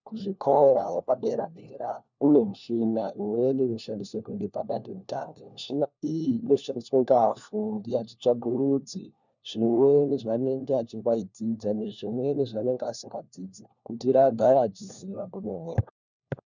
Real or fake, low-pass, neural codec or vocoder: fake; 7.2 kHz; codec, 16 kHz, 1 kbps, FunCodec, trained on LibriTTS, 50 frames a second